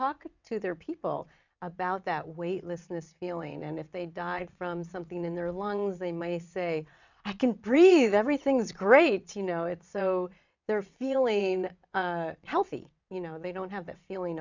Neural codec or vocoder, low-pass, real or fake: vocoder, 22.05 kHz, 80 mel bands, WaveNeXt; 7.2 kHz; fake